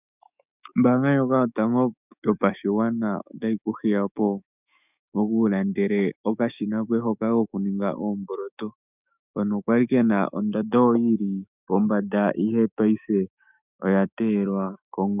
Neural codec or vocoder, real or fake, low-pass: autoencoder, 48 kHz, 128 numbers a frame, DAC-VAE, trained on Japanese speech; fake; 3.6 kHz